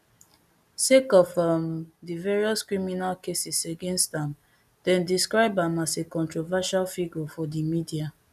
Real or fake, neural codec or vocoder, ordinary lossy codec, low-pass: real; none; none; 14.4 kHz